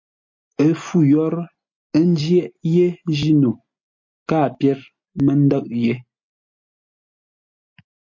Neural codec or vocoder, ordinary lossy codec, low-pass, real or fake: none; MP3, 48 kbps; 7.2 kHz; real